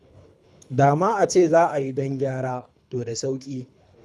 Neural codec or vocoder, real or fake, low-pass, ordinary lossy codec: codec, 24 kHz, 3 kbps, HILCodec; fake; none; none